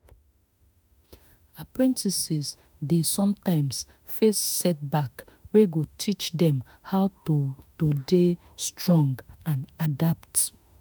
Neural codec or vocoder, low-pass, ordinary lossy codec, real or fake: autoencoder, 48 kHz, 32 numbers a frame, DAC-VAE, trained on Japanese speech; none; none; fake